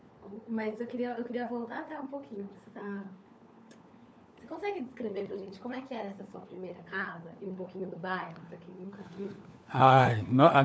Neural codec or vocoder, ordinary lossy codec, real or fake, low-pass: codec, 16 kHz, 4 kbps, FunCodec, trained on LibriTTS, 50 frames a second; none; fake; none